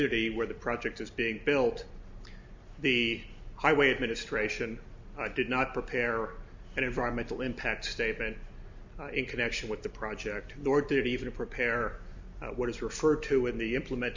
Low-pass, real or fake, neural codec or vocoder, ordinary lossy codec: 7.2 kHz; real; none; MP3, 64 kbps